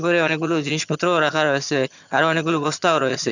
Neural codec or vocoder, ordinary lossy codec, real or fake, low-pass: vocoder, 22.05 kHz, 80 mel bands, HiFi-GAN; none; fake; 7.2 kHz